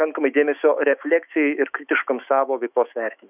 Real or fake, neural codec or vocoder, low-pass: fake; autoencoder, 48 kHz, 128 numbers a frame, DAC-VAE, trained on Japanese speech; 3.6 kHz